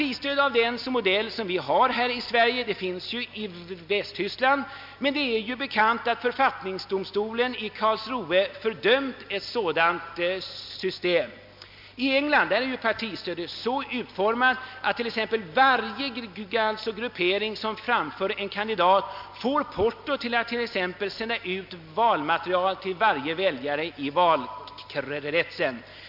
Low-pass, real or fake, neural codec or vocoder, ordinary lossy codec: 5.4 kHz; real; none; MP3, 48 kbps